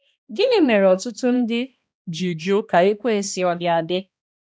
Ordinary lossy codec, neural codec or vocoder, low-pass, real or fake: none; codec, 16 kHz, 1 kbps, X-Codec, HuBERT features, trained on balanced general audio; none; fake